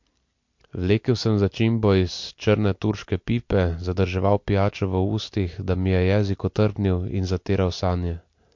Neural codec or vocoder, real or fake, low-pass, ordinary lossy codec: none; real; 7.2 kHz; MP3, 48 kbps